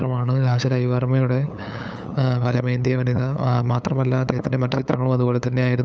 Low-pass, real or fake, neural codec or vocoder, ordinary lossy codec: none; fake; codec, 16 kHz, 8 kbps, FunCodec, trained on LibriTTS, 25 frames a second; none